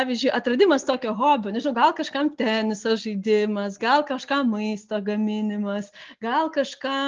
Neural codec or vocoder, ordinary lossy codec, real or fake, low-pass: none; Opus, 24 kbps; real; 7.2 kHz